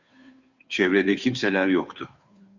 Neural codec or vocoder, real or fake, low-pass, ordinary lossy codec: codec, 16 kHz, 2 kbps, FunCodec, trained on Chinese and English, 25 frames a second; fake; 7.2 kHz; Opus, 64 kbps